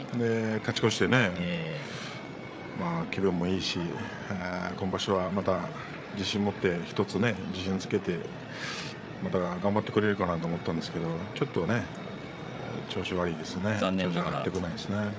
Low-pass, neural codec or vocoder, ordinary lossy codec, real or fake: none; codec, 16 kHz, 8 kbps, FreqCodec, larger model; none; fake